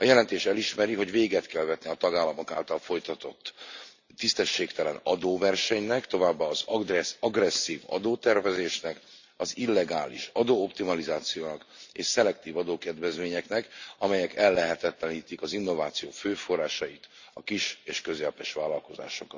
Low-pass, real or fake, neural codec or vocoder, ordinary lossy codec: 7.2 kHz; real; none; Opus, 64 kbps